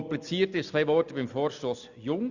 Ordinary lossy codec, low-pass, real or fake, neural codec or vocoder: Opus, 64 kbps; 7.2 kHz; real; none